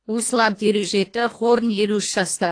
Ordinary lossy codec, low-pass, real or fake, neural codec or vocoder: AAC, 48 kbps; 9.9 kHz; fake; codec, 24 kHz, 1.5 kbps, HILCodec